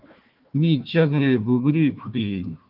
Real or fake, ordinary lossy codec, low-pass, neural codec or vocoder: fake; Opus, 32 kbps; 5.4 kHz; codec, 16 kHz, 1 kbps, FunCodec, trained on Chinese and English, 50 frames a second